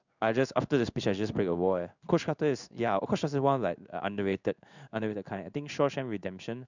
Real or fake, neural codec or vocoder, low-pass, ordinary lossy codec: fake; codec, 16 kHz in and 24 kHz out, 1 kbps, XY-Tokenizer; 7.2 kHz; none